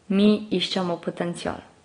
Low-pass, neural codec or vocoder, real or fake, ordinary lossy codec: 9.9 kHz; none; real; AAC, 32 kbps